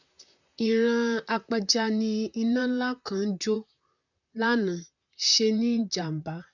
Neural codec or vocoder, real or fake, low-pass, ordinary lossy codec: vocoder, 44.1 kHz, 128 mel bands, Pupu-Vocoder; fake; 7.2 kHz; none